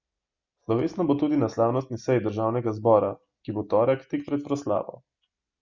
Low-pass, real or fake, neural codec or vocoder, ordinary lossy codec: 7.2 kHz; real; none; Opus, 64 kbps